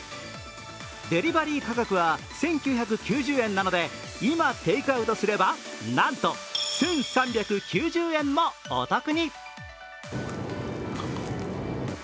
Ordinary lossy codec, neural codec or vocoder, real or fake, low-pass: none; none; real; none